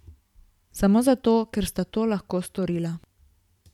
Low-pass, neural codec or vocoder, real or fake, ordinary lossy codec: 19.8 kHz; vocoder, 44.1 kHz, 128 mel bands every 512 samples, BigVGAN v2; fake; none